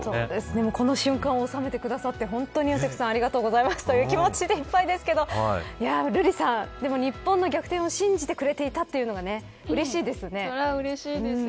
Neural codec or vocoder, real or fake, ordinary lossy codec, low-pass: none; real; none; none